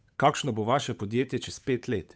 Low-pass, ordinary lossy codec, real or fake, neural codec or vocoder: none; none; fake; codec, 16 kHz, 8 kbps, FunCodec, trained on Chinese and English, 25 frames a second